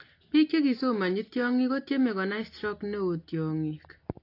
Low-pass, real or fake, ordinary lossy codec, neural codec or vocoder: 5.4 kHz; real; AAC, 32 kbps; none